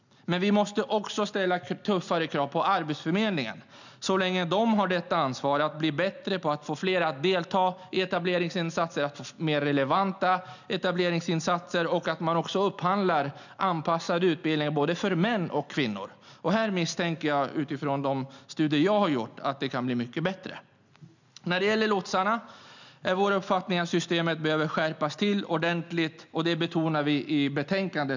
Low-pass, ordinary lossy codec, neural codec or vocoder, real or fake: 7.2 kHz; none; none; real